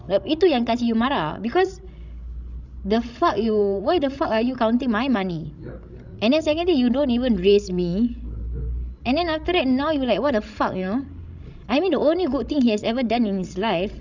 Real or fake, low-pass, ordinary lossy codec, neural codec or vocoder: fake; 7.2 kHz; none; codec, 16 kHz, 16 kbps, FreqCodec, larger model